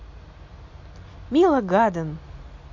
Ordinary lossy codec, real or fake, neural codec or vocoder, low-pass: MP3, 48 kbps; real; none; 7.2 kHz